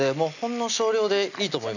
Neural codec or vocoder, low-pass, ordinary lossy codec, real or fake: none; 7.2 kHz; none; real